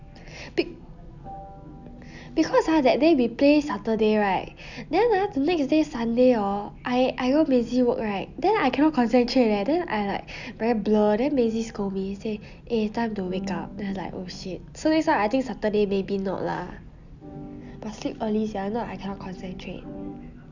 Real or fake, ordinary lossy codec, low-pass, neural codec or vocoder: real; none; 7.2 kHz; none